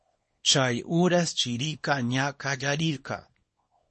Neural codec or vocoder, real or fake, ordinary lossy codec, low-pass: codec, 24 kHz, 0.9 kbps, WavTokenizer, small release; fake; MP3, 32 kbps; 10.8 kHz